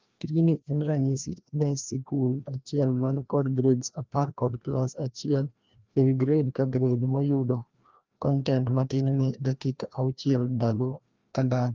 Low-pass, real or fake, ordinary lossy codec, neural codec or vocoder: 7.2 kHz; fake; Opus, 32 kbps; codec, 16 kHz, 1 kbps, FreqCodec, larger model